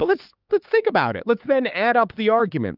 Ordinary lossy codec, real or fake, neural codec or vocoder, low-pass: Opus, 32 kbps; fake; codec, 16 kHz, 4 kbps, X-Codec, HuBERT features, trained on LibriSpeech; 5.4 kHz